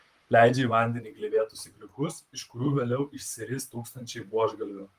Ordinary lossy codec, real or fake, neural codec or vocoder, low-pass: Opus, 32 kbps; fake; vocoder, 44.1 kHz, 128 mel bands, Pupu-Vocoder; 14.4 kHz